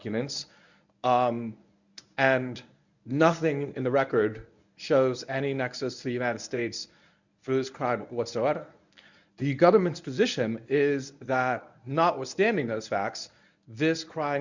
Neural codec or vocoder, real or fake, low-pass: codec, 24 kHz, 0.9 kbps, WavTokenizer, medium speech release version 1; fake; 7.2 kHz